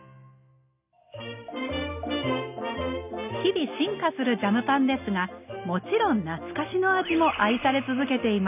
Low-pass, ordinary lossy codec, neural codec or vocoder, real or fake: 3.6 kHz; none; none; real